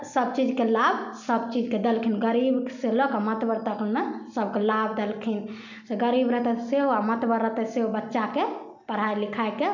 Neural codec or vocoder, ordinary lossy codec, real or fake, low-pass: none; none; real; 7.2 kHz